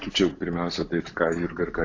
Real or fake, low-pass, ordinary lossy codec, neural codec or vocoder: real; 7.2 kHz; AAC, 48 kbps; none